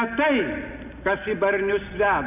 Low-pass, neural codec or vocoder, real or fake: 3.6 kHz; none; real